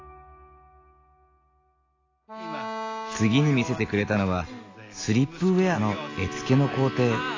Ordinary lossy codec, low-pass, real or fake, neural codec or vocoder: AAC, 32 kbps; 7.2 kHz; real; none